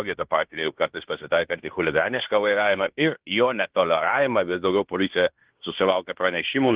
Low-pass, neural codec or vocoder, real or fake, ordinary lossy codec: 3.6 kHz; codec, 16 kHz in and 24 kHz out, 0.9 kbps, LongCat-Audio-Codec, fine tuned four codebook decoder; fake; Opus, 16 kbps